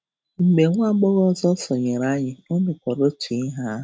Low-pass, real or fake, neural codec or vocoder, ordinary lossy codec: none; real; none; none